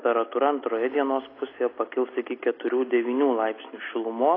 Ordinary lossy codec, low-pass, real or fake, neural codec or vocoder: AAC, 24 kbps; 5.4 kHz; real; none